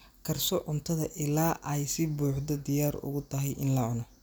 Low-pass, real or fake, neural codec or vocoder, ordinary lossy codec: none; real; none; none